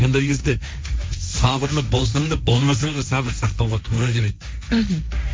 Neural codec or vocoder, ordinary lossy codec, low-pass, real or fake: codec, 16 kHz, 1.1 kbps, Voila-Tokenizer; none; none; fake